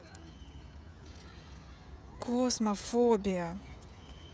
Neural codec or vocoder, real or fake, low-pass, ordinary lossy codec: codec, 16 kHz, 16 kbps, FreqCodec, smaller model; fake; none; none